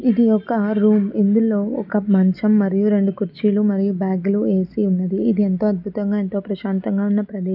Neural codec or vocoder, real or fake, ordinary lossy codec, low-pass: none; real; none; 5.4 kHz